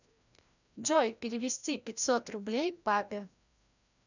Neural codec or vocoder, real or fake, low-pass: codec, 16 kHz, 1 kbps, FreqCodec, larger model; fake; 7.2 kHz